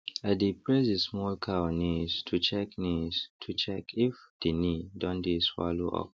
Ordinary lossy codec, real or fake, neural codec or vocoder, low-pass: none; real; none; none